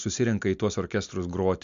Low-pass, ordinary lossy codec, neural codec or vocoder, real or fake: 7.2 kHz; MP3, 64 kbps; none; real